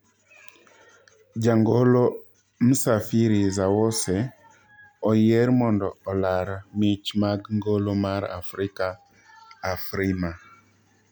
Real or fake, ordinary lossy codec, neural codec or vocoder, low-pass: real; none; none; none